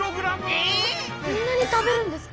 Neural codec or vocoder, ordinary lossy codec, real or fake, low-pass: none; none; real; none